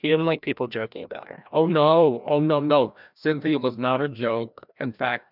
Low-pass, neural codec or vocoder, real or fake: 5.4 kHz; codec, 16 kHz, 1 kbps, FreqCodec, larger model; fake